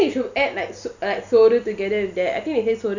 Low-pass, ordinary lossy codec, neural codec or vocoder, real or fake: 7.2 kHz; none; none; real